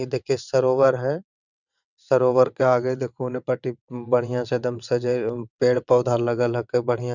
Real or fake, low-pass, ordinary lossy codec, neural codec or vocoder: fake; 7.2 kHz; none; vocoder, 22.05 kHz, 80 mel bands, WaveNeXt